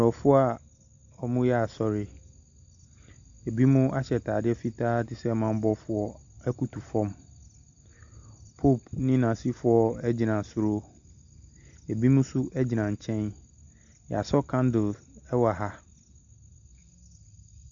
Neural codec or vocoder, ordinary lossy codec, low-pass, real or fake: none; AAC, 64 kbps; 7.2 kHz; real